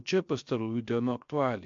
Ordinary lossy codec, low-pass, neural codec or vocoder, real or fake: MP3, 48 kbps; 7.2 kHz; codec, 16 kHz, 0.3 kbps, FocalCodec; fake